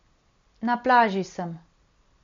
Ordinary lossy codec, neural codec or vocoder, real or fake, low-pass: MP3, 48 kbps; none; real; 7.2 kHz